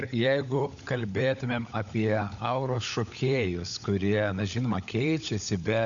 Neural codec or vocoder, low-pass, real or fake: codec, 16 kHz, 16 kbps, FunCodec, trained on LibriTTS, 50 frames a second; 7.2 kHz; fake